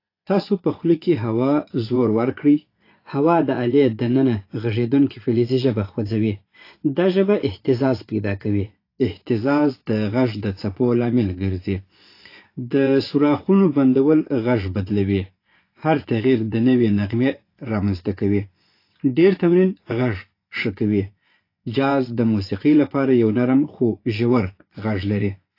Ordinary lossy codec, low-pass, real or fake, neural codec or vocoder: AAC, 32 kbps; 5.4 kHz; fake; vocoder, 24 kHz, 100 mel bands, Vocos